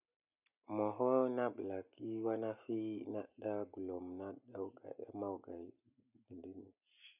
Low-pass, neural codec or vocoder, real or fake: 3.6 kHz; none; real